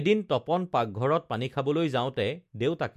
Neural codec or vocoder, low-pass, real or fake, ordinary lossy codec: none; 14.4 kHz; real; MP3, 64 kbps